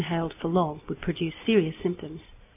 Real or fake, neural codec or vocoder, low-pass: real; none; 3.6 kHz